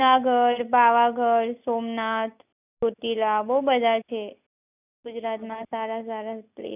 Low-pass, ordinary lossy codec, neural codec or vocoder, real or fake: 3.6 kHz; none; none; real